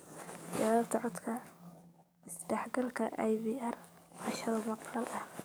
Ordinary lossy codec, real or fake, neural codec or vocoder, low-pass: none; fake; codec, 44.1 kHz, 7.8 kbps, DAC; none